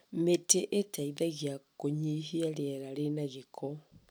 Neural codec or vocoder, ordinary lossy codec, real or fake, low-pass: none; none; real; none